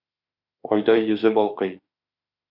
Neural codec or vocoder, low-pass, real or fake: autoencoder, 48 kHz, 32 numbers a frame, DAC-VAE, trained on Japanese speech; 5.4 kHz; fake